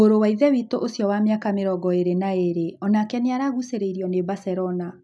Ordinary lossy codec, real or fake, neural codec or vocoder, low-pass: none; real; none; none